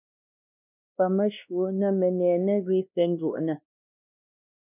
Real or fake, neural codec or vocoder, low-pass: fake; codec, 16 kHz, 1 kbps, X-Codec, WavLM features, trained on Multilingual LibriSpeech; 3.6 kHz